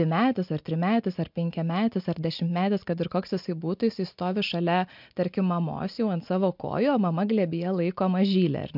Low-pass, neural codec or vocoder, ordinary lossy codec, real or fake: 5.4 kHz; none; MP3, 48 kbps; real